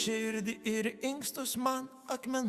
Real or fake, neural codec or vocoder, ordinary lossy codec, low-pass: fake; autoencoder, 48 kHz, 128 numbers a frame, DAC-VAE, trained on Japanese speech; Opus, 64 kbps; 14.4 kHz